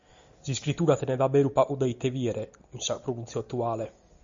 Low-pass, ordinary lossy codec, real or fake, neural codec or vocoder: 7.2 kHz; Opus, 64 kbps; real; none